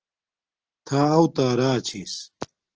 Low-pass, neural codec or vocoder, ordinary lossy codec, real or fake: 7.2 kHz; none; Opus, 16 kbps; real